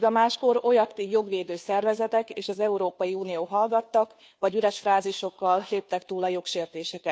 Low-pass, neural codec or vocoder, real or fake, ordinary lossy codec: none; codec, 16 kHz, 2 kbps, FunCodec, trained on Chinese and English, 25 frames a second; fake; none